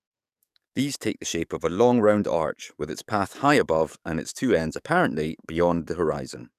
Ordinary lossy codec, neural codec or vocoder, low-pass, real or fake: none; codec, 44.1 kHz, 7.8 kbps, DAC; 14.4 kHz; fake